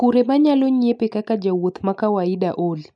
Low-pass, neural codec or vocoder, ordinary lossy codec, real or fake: 9.9 kHz; none; none; real